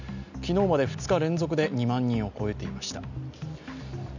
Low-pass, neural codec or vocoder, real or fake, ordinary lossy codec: 7.2 kHz; none; real; none